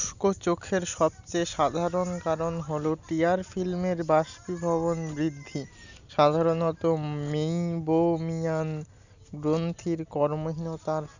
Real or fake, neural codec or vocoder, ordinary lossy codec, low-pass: real; none; none; 7.2 kHz